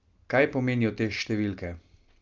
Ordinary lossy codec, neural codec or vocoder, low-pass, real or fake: Opus, 24 kbps; none; 7.2 kHz; real